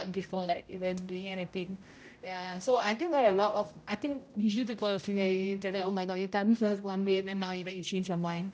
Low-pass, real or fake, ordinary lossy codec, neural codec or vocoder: none; fake; none; codec, 16 kHz, 0.5 kbps, X-Codec, HuBERT features, trained on general audio